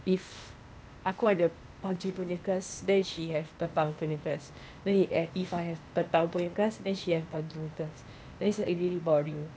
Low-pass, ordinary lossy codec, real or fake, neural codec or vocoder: none; none; fake; codec, 16 kHz, 0.8 kbps, ZipCodec